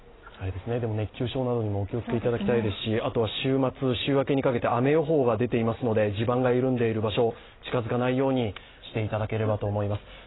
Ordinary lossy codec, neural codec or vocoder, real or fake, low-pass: AAC, 16 kbps; none; real; 7.2 kHz